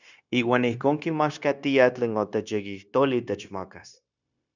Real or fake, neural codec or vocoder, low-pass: fake; codec, 16 kHz, 0.9 kbps, LongCat-Audio-Codec; 7.2 kHz